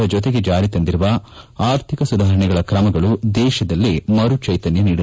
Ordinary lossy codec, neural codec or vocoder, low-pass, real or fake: none; none; none; real